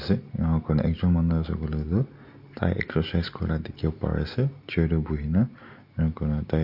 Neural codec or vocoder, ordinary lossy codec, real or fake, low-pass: none; MP3, 32 kbps; real; 5.4 kHz